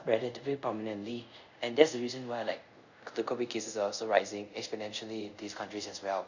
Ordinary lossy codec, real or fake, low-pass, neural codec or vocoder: none; fake; 7.2 kHz; codec, 24 kHz, 0.5 kbps, DualCodec